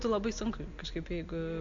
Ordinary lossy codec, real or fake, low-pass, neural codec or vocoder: AAC, 64 kbps; real; 7.2 kHz; none